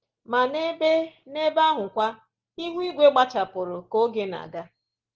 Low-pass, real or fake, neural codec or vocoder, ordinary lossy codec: 7.2 kHz; real; none; Opus, 16 kbps